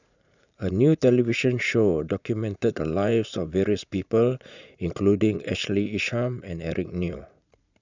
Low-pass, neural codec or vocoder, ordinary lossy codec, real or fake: 7.2 kHz; vocoder, 44.1 kHz, 80 mel bands, Vocos; none; fake